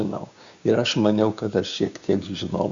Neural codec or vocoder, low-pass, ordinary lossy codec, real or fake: codec, 16 kHz, 6 kbps, DAC; 7.2 kHz; Opus, 64 kbps; fake